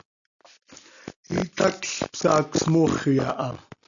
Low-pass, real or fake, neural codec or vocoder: 7.2 kHz; real; none